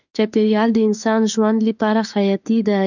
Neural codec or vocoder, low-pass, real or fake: autoencoder, 48 kHz, 32 numbers a frame, DAC-VAE, trained on Japanese speech; 7.2 kHz; fake